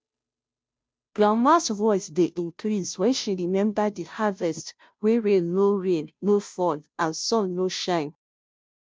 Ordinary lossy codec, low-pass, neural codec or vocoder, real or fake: none; none; codec, 16 kHz, 0.5 kbps, FunCodec, trained on Chinese and English, 25 frames a second; fake